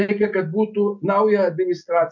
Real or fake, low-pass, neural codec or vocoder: real; 7.2 kHz; none